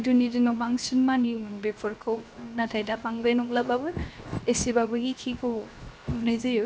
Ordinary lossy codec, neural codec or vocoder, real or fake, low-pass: none; codec, 16 kHz, 0.7 kbps, FocalCodec; fake; none